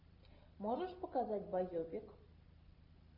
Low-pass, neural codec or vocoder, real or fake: 5.4 kHz; none; real